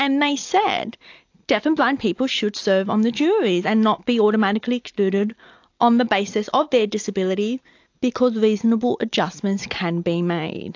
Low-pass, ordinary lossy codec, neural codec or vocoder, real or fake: 7.2 kHz; AAC, 48 kbps; none; real